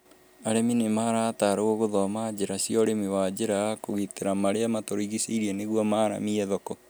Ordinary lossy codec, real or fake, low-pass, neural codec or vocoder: none; real; none; none